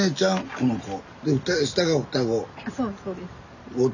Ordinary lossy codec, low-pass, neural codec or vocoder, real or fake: none; 7.2 kHz; none; real